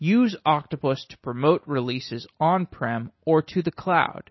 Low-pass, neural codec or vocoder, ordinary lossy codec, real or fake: 7.2 kHz; none; MP3, 24 kbps; real